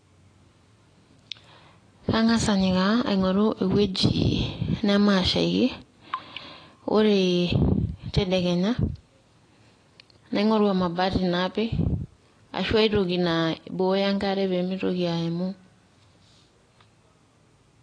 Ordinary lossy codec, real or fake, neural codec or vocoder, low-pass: AAC, 32 kbps; real; none; 9.9 kHz